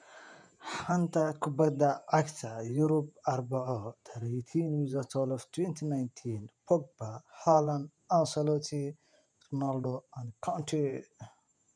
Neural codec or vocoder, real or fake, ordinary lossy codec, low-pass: vocoder, 48 kHz, 128 mel bands, Vocos; fake; none; 9.9 kHz